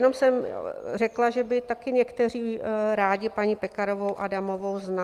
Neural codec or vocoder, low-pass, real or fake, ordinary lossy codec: none; 14.4 kHz; real; Opus, 32 kbps